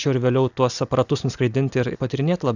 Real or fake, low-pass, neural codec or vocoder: real; 7.2 kHz; none